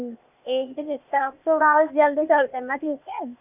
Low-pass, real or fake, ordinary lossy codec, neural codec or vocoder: 3.6 kHz; fake; none; codec, 16 kHz, 0.8 kbps, ZipCodec